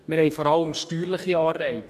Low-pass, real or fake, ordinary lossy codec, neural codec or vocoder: 14.4 kHz; fake; none; codec, 44.1 kHz, 2.6 kbps, DAC